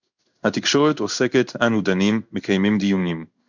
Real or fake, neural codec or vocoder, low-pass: fake; codec, 16 kHz in and 24 kHz out, 1 kbps, XY-Tokenizer; 7.2 kHz